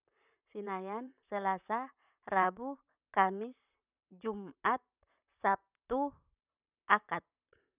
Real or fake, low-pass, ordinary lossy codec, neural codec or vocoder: fake; 3.6 kHz; none; vocoder, 44.1 kHz, 128 mel bands, Pupu-Vocoder